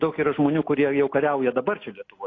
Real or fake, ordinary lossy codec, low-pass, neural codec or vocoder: real; AAC, 32 kbps; 7.2 kHz; none